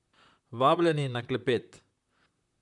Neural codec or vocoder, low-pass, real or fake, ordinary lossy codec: vocoder, 44.1 kHz, 128 mel bands, Pupu-Vocoder; 10.8 kHz; fake; none